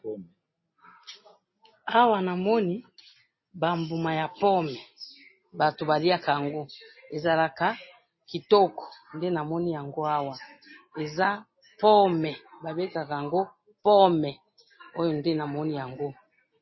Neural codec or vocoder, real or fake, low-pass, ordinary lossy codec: none; real; 7.2 kHz; MP3, 24 kbps